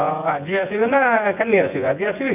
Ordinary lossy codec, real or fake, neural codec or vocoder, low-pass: none; fake; vocoder, 24 kHz, 100 mel bands, Vocos; 3.6 kHz